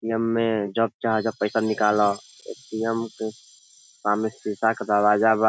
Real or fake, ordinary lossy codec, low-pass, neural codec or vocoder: real; none; none; none